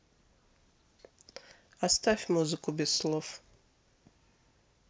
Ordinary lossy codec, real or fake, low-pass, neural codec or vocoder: none; real; none; none